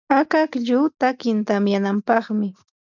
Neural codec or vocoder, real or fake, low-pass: none; real; 7.2 kHz